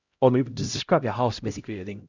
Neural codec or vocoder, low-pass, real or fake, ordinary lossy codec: codec, 16 kHz, 0.5 kbps, X-Codec, HuBERT features, trained on LibriSpeech; 7.2 kHz; fake; Opus, 64 kbps